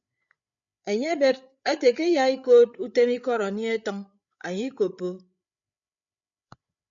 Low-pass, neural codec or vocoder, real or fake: 7.2 kHz; codec, 16 kHz, 8 kbps, FreqCodec, larger model; fake